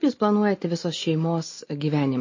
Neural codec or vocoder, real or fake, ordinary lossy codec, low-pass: none; real; MP3, 32 kbps; 7.2 kHz